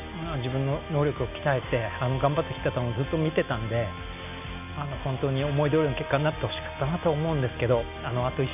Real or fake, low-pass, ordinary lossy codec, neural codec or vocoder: real; 3.6 kHz; none; none